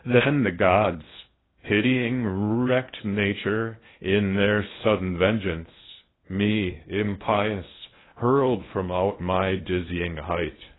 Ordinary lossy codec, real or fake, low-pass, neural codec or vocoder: AAC, 16 kbps; fake; 7.2 kHz; codec, 16 kHz in and 24 kHz out, 0.8 kbps, FocalCodec, streaming, 65536 codes